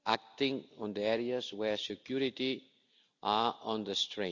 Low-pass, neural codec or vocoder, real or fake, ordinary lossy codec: 7.2 kHz; none; real; none